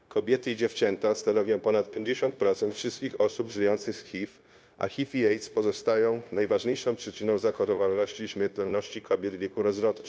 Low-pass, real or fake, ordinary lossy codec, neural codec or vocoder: none; fake; none; codec, 16 kHz, 0.9 kbps, LongCat-Audio-Codec